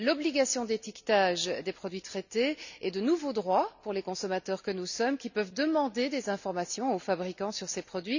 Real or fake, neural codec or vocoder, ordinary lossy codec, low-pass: real; none; none; 7.2 kHz